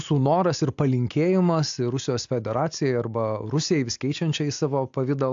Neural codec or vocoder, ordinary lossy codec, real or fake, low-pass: none; AAC, 64 kbps; real; 7.2 kHz